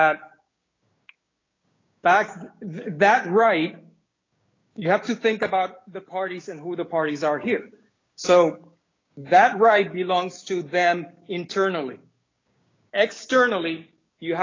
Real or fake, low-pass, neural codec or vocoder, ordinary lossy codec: fake; 7.2 kHz; codec, 16 kHz, 6 kbps, DAC; AAC, 32 kbps